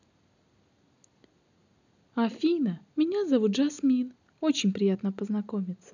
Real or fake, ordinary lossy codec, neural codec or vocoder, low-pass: real; none; none; 7.2 kHz